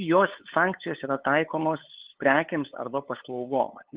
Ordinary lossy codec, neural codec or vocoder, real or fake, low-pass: Opus, 16 kbps; codec, 16 kHz, 8 kbps, FunCodec, trained on LibriTTS, 25 frames a second; fake; 3.6 kHz